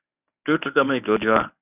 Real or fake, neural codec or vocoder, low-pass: fake; codec, 24 kHz, 0.9 kbps, WavTokenizer, medium speech release version 1; 3.6 kHz